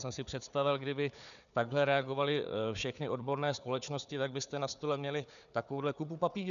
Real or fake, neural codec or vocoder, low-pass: fake; codec, 16 kHz, 4 kbps, FunCodec, trained on Chinese and English, 50 frames a second; 7.2 kHz